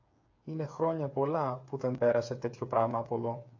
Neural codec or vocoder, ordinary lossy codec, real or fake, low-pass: codec, 16 kHz, 8 kbps, FreqCodec, smaller model; AAC, 48 kbps; fake; 7.2 kHz